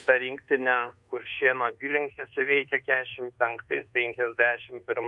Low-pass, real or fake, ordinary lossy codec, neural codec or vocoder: 10.8 kHz; fake; MP3, 64 kbps; codec, 24 kHz, 1.2 kbps, DualCodec